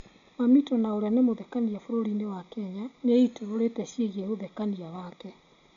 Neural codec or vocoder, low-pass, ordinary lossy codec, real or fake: none; 7.2 kHz; none; real